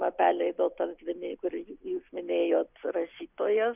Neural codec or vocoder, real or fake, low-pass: none; real; 3.6 kHz